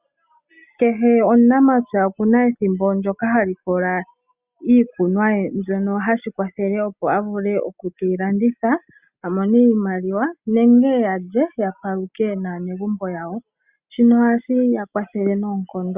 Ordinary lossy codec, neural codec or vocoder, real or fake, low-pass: Opus, 64 kbps; none; real; 3.6 kHz